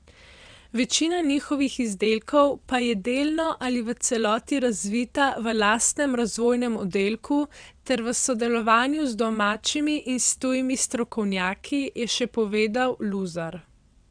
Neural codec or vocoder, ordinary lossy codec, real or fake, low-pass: vocoder, 22.05 kHz, 80 mel bands, WaveNeXt; none; fake; 9.9 kHz